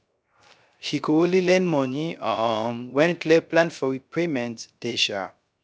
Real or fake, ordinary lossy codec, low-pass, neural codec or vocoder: fake; none; none; codec, 16 kHz, 0.3 kbps, FocalCodec